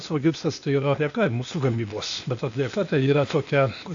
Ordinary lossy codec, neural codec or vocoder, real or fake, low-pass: AAC, 64 kbps; codec, 16 kHz, 0.8 kbps, ZipCodec; fake; 7.2 kHz